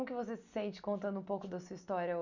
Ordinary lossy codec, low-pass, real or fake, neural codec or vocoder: none; 7.2 kHz; real; none